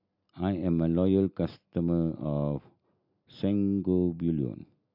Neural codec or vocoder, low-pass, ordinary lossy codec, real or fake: none; 5.4 kHz; none; real